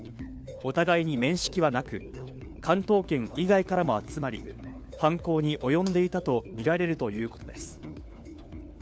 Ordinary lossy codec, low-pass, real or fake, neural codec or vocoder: none; none; fake; codec, 16 kHz, 4 kbps, FunCodec, trained on LibriTTS, 50 frames a second